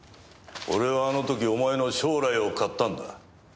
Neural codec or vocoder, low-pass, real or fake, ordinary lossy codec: none; none; real; none